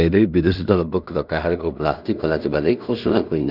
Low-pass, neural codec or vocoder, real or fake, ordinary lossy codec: 5.4 kHz; codec, 16 kHz in and 24 kHz out, 0.4 kbps, LongCat-Audio-Codec, two codebook decoder; fake; none